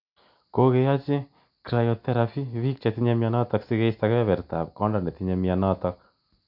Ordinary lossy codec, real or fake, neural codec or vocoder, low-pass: MP3, 48 kbps; real; none; 5.4 kHz